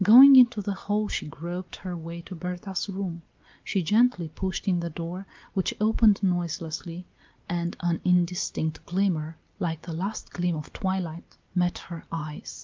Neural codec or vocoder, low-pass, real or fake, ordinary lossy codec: none; 7.2 kHz; real; Opus, 24 kbps